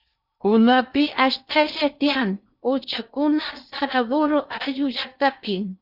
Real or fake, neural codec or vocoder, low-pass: fake; codec, 16 kHz in and 24 kHz out, 0.6 kbps, FocalCodec, streaming, 2048 codes; 5.4 kHz